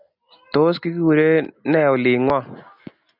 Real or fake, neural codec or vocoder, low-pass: real; none; 5.4 kHz